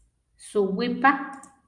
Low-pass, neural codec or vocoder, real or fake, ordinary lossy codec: 10.8 kHz; none; real; Opus, 32 kbps